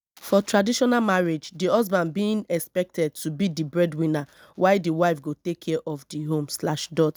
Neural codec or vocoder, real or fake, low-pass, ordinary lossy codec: none; real; none; none